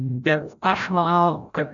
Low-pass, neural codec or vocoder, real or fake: 7.2 kHz; codec, 16 kHz, 0.5 kbps, FreqCodec, larger model; fake